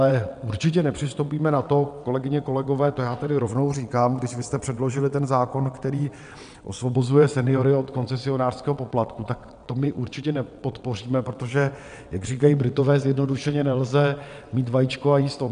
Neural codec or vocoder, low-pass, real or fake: vocoder, 22.05 kHz, 80 mel bands, WaveNeXt; 9.9 kHz; fake